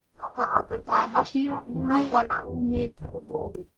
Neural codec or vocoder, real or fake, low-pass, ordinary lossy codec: codec, 44.1 kHz, 0.9 kbps, DAC; fake; 19.8 kHz; Opus, 32 kbps